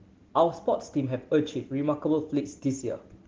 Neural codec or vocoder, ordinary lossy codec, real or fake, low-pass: none; Opus, 16 kbps; real; 7.2 kHz